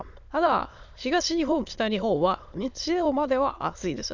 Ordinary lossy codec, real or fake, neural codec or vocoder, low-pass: none; fake; autoencoder, 22.05 kHz, a latent of 192 numbers a frame, VITS, trained on many speakers; 7.2 kHz